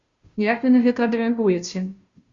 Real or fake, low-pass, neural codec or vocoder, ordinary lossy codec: fake; 7.2 kHz; codec, 16 kHz, 0.5 kbps, FunCodec, trained on Chinese and English, 25 frames a second; Opus, 64 kbps